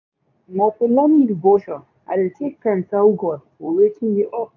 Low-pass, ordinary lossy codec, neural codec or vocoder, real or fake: 7.2 kHz; none; codec, 24 kHz, 0.9 kbps, WavTokenizer, medium speech release version 2; fake